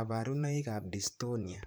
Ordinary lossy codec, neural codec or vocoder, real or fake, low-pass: none; vocoder, 44.1 kHz, 128 mel bands, Pupu-Vocoder; fake; none